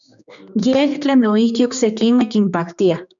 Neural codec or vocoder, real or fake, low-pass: codec, 16 kHz, 2 kbps, X-Codec, HuBERT features, trained on general audio; fake; 7.2 kHz